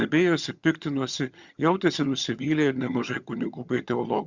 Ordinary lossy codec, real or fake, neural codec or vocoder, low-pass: Opus, 64 kbps; fake; vocoder, 22.05 kHz, 80 mel bands, HiFi-GAN; 7.2 kHz